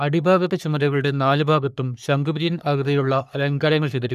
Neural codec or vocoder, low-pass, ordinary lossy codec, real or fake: codec, 44.1 kHz, 3.4 kbps, Pupu-Codec; 14.4 kHz; none; fake